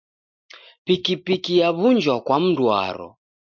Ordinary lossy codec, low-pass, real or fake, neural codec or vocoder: MP3, 64 kbps; 7.2 kHz; real; none